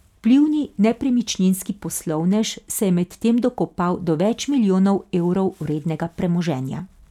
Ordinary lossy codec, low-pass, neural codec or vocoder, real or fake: none; 19.8 kHz; none; real